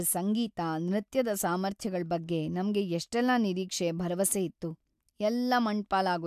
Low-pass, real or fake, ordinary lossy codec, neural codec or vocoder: 14.4 kHz; real; AAC, 96 kbps; none